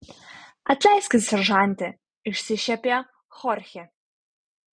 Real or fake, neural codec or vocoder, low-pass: real; none; 9.9 kHz